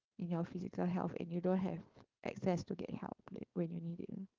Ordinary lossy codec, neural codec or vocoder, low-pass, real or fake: Opus, 32 kbps; codec, 16 kHz, 4.8 kbps, FACodec; 7.2 kHz; fake